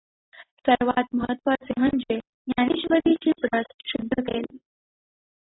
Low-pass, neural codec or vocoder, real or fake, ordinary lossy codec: 7.2 kHz; none; real; AAC, 16 kbps